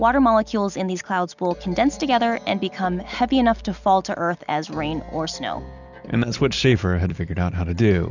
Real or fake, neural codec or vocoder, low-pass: real; none; 7.2 kHz